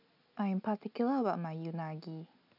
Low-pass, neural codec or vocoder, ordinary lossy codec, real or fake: 5.4 kHz; none; none; real